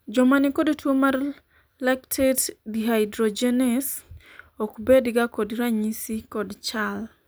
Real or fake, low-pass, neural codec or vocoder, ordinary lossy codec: real; none; none; none